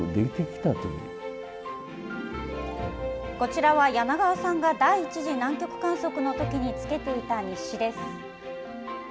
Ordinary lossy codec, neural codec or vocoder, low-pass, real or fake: none; none; none; real